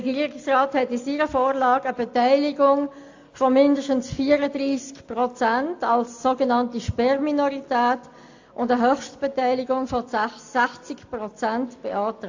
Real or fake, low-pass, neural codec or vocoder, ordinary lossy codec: real; 7.2 kHz; none; AAC, 48 kbps